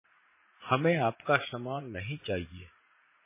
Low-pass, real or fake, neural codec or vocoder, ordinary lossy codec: 3.6 kHz; fake; vocoder, 44.1 kHz, 80 mel bands, Vocos; MP3, 16 kbps